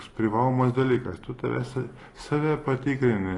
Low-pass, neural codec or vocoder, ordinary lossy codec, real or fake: 10.8 kHz; none; AAC, 32 kbps; real